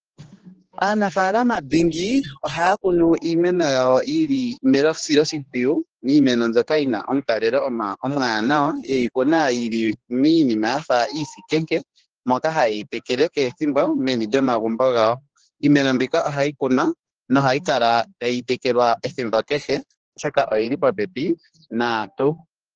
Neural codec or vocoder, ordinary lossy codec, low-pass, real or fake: codec, 16 kHz, 2 kbps, X-Codec, HuBERT features, trained on general audio; Opus, 16 kbps; 7.2 kHz; fake